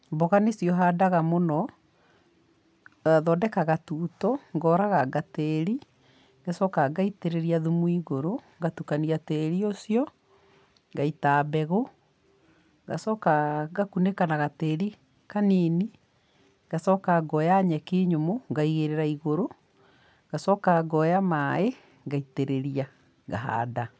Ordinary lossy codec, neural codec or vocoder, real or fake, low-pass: none; none; real; none